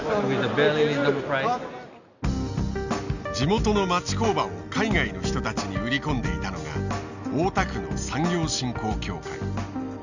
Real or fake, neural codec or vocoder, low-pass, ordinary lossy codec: real; none; 7.2 kHz; none